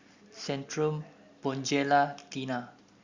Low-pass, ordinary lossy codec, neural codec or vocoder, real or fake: 7.2 kHz; Opus, 64 kbps; none; real